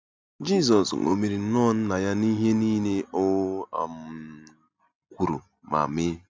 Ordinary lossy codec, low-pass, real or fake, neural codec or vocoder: none; none; real; none